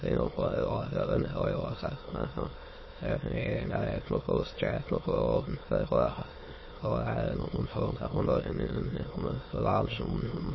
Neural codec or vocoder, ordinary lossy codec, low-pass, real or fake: autoencoder, 22.05 kHz, a latent of 192 numbers a frame, VITS, trained on many speakers; MP3, 24 kbps; 7.2 kHz; fake